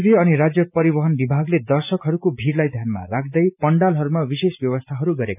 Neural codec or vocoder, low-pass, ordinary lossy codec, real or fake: none; 3.6 kHz; none; real